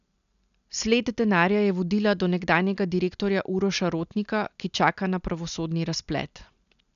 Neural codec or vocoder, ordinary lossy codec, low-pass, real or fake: none; none; 7.2 kHz; real